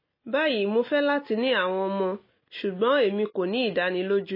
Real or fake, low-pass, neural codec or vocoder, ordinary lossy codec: real; 5.4 kHz; none; MP3, 24 kbps